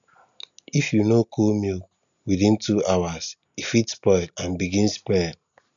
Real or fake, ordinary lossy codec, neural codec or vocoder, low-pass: real; none; none; 7.2 kHz